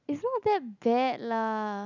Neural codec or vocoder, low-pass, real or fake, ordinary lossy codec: none; 7.2 kHz; real; none